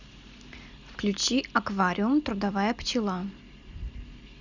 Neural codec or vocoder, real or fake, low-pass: none; real; 7.2 kHz